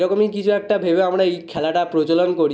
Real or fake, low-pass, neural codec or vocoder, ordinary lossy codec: real; none; none; none